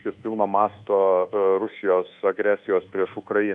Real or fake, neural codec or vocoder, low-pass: fake; codec, 24 kHz, 1.2 kbps, DualCodec; 10.8 kHz